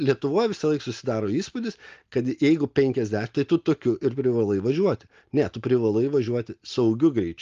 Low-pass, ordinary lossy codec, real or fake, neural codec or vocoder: 7.2 kHz; Opus, 32 kbps; real; none